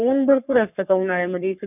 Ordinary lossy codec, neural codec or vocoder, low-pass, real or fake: none; codec, 44.1 kHz, 3.4 kbps, Pupu-Codec; 3.6 kHz; fake